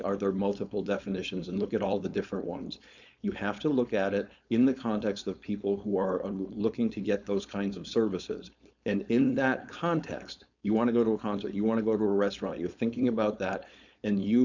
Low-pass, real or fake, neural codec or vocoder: 7.2 kHz; fake; codec, 16 kHz, 4.8 kbps, FACodec